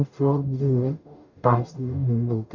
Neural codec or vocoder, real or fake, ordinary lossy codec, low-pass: codec, 44.1 kHz, 0.9 kbps, DAC; fake; none; 7.2 kHz